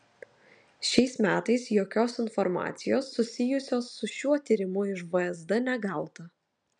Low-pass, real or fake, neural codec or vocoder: 9.9 kHz; real; none